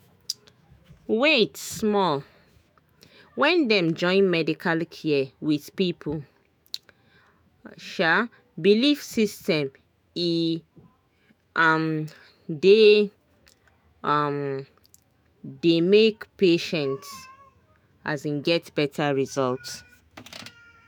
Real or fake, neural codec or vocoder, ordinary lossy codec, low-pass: fake; autoencoder, 48 kHz, 128 numbers a frame, DAC-VAE, trained on Japanese speech; none; none